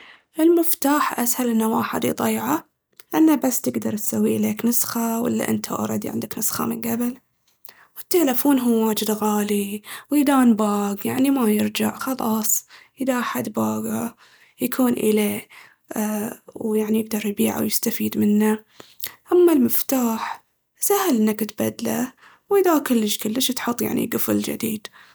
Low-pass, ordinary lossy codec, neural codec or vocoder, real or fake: none; none; none; real